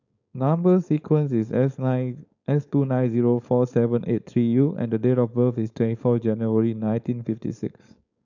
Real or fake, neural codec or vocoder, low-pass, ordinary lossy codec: fake; codec, 16 kHz, 4.8 kbps, FACodec; 7.2 kHz; none